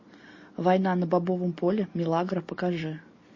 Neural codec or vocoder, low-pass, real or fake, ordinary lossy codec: none; 7.2 kHz; real; MP3, 32 kbps